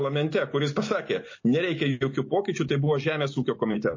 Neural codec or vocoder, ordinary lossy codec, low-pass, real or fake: none; MP3, 32 kbps; 7.2 kHz; real